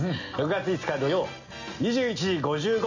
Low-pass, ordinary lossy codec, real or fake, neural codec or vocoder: 7.2 kHz; none; real; none